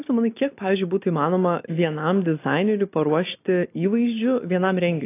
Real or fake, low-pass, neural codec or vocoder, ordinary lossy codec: real; 3.6 kHz; none; AAC, 24 kbps